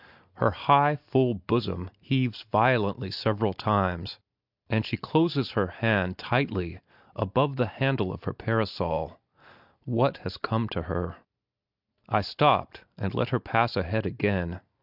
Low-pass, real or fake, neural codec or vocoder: 5.4 kHz; real; none